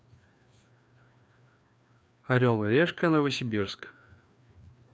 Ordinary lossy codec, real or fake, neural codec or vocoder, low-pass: none; fake; codec, 16 kHz, 2 kbps, FreqCodec, larger model; none